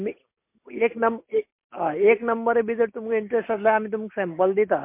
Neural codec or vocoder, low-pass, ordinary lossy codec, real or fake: none; 3.6 kHz; MP3, 24 kbps; real